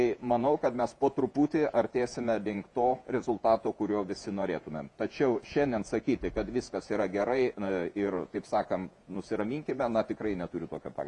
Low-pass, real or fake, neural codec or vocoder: 10.8 kHz; fake; vocoder, 48 kHz, 128 mel bands, Vocos